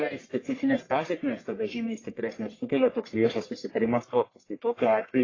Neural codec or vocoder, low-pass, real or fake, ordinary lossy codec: codec, 44.1 kHz, 1.7 kbps, Pupu-Codec; 7.2 kHz; fake; AAC, 32 kbps